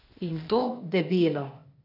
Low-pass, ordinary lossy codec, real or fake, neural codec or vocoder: 5.4 kHz; none; fake; codec, 16 kHz, 0.8 kbps, ZipCodec